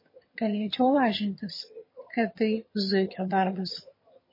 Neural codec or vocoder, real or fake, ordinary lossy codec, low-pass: vocoder, 22.05 kHz, 80 mel bands, HiFi-GAN; fake; MP3, 24 kbps; 5.4 kHz